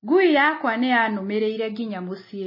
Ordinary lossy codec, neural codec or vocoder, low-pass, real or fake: MP3, 24 kbps; none; 5.4 kHz; real